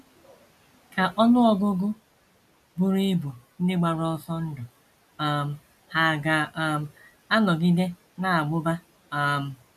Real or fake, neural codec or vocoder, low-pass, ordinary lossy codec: real; none; 14.4 kHz; none